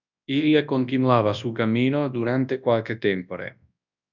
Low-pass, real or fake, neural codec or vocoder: 7.2 kHz; fake; codec, 24 kHz, 0.9 kbps, WavTokenizer, large speech release